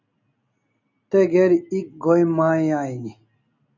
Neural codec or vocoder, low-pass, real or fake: none; 7.2 kHz; real